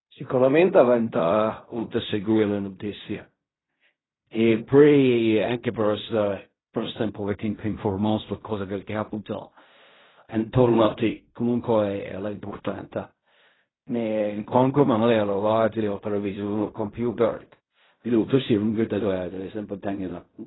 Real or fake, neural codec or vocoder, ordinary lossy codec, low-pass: fake; codec, 16 kHz in and 24 kHz out, 0.4 kbps, LongCat-Audio-Codec, fine tuned four codebook decoder; AAC, 16 kbps; 7.2 kHz